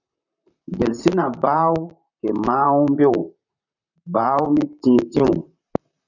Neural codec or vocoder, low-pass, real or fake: vocoder, 44.1 kHz, 128 mel bands, Pupu-Vocoder; 7.2 kHz; fake